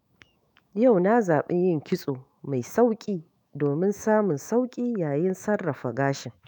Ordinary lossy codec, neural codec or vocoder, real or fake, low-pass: none; autoencoder, 48 kHz, 128 numbers a frame, DAC-VAE, trained on Japanese speech; fake; none